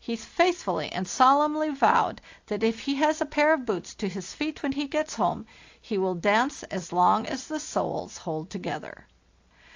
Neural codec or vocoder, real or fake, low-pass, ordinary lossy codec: none; real; 7.2 kHz; AAC, 48 kbps